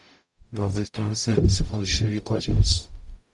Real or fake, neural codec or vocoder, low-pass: fake; codec, 44.1 kHz, 0.9 kbps, DAC; 10.8 kHz